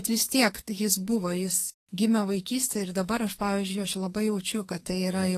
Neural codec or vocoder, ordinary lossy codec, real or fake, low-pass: codec, 44.1 kHz, 2.6 kbps, SNAC; AAC, 48 kbps; fake; 14.4 kHz